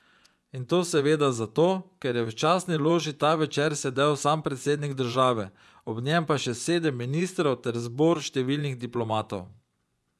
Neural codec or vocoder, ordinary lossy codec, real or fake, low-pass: vocoder, 24 kHz, 100 mel bands, Vocos; none; fake; none